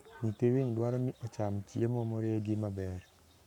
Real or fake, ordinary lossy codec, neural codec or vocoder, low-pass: fake; none; codec, 44.1 kHz, 7.8 kbps, Pupu-Codec; 19.8 kHz